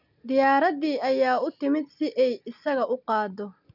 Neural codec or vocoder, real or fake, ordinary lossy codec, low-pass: none; real; none; 5.4 kHz